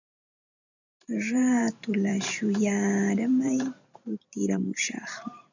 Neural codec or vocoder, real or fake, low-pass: vocoder, 44.1 kHz, 128 mel bands every 512 samples, BigVGAN v2; fake; 7.2 kHz